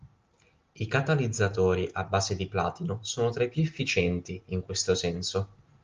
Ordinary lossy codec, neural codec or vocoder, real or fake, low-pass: Opus, 32 kbps; none; real; 7.2 kHz